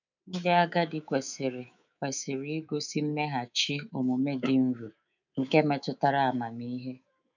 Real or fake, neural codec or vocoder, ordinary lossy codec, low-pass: fake; codec, 24 kHz, 3.1 kbps, DualCodec; none; 7.2 kHz